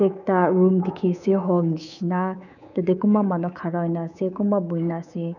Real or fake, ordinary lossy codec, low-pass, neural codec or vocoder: fake; none; 7.2 kHz; codec, 24 kHz, 3.1 kbps, DualCodec